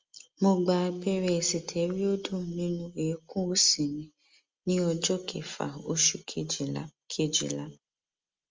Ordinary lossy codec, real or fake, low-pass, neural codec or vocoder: Opus, 32 kbps; real; 7.2 kHz; none